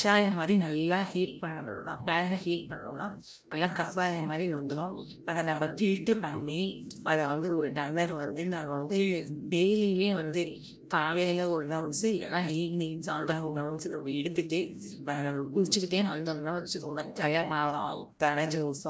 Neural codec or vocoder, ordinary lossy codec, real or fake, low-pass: codec, 16 kHz, 0.5 kbps, FreqCodec, larger model; none; fake; none